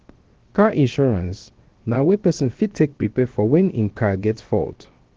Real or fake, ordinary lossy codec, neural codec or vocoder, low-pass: fake; Opus, 16 kbps; codec, 16 kHz, 0.7 kbps, FocalCodec; 7.2 kHz